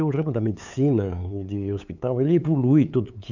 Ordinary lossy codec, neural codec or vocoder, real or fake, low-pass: none; codec, 16 kHz, 8 kbps, FunCodec, trained on LibriTTS, 25 frames a second; fake; 7.2 kHz